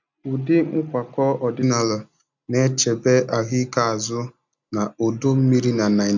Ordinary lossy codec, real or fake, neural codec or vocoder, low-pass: none; real; none; 7.2 kHz